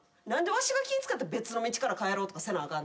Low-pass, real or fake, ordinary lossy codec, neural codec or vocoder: none; real; none; none